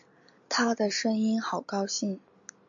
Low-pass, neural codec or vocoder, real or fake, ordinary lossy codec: 7.2 kHz; none; real; AAC, 64 kbps